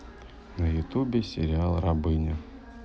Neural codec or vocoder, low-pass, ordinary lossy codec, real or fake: none; none; none; real